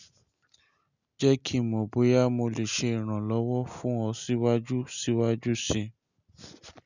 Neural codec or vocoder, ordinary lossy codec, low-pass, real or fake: none; none; 7.2 kHz; real